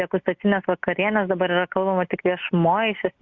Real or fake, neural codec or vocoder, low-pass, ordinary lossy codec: real; none; 7.2 kHz; Opus, 64 kbps